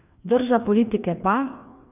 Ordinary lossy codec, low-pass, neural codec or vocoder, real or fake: none; 3.6 kHz; codec, 16 kHz, 2 kbps, FreqCodec, larger model; fake